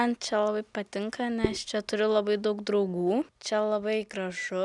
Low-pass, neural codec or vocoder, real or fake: 10.8 kHz; none; real